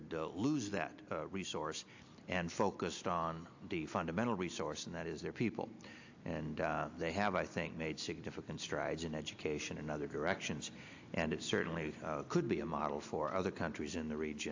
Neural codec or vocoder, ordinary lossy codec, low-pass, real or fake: none; AAC, 48 kbps; 7.2 kHz; real